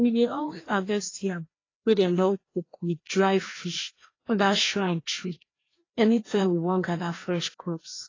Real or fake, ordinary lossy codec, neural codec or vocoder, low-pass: fake; AAC, 32 kbps; codec, 16 kHz, 1 kbps, FreqCodec, larger model; 7.2 kHz